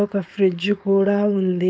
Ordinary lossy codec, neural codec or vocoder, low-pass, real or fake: none; codec, 16 kHz, 4.8 kbps, FACodec; none; fake